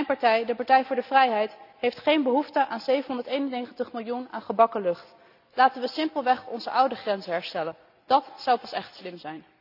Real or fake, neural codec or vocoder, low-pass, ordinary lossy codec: real; none; 5.4 kHz; none